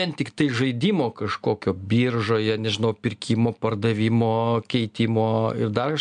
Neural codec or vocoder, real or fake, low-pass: none; real; 9.9 kHz